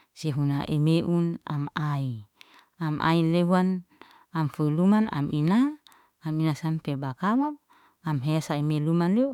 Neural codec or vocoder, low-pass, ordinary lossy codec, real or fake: autoencoder, 48 kHz, 32 numbers a frame, DAC-VAE, trained on Japanese speech; 19.8 kHz; none; fake